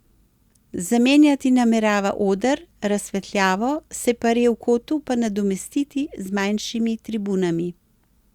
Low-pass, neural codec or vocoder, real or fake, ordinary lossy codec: 19.8 kHz; none; real; Opus, 64 kbps